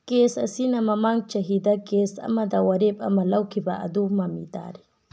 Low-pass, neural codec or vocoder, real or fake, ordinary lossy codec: none; none; real; none